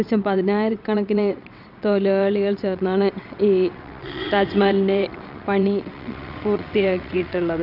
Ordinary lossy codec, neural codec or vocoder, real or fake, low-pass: none; vocoder, 22.05 kHz, 80 mel bands, WaveNeXt; fake; 5.4 kHz